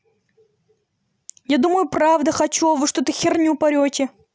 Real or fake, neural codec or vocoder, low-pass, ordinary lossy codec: real; none; none; none